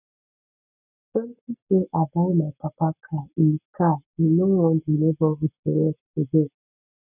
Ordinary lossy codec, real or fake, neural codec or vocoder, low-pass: none; fake; codec, 44.1 kHz, 7.8 kbps, Pupu-Codec; 3.6 kHz